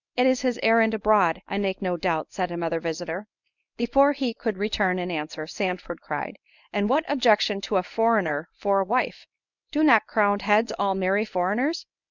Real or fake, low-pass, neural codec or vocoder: fake; 7.2 kHz; codec, 24 kHz, 0.9 kbps, WavTokenizer, medium speech release version 1